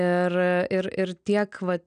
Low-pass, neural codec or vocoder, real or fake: 9.9 kHz; none; real